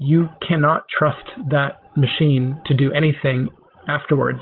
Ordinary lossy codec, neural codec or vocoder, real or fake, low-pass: Opus, 16 kbps; codec, 16 kHz, 16 kbps, FreqCodec, larger model; fake; 5.4 kHz